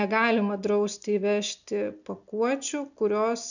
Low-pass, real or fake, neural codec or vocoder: 7.2 kHz; real; none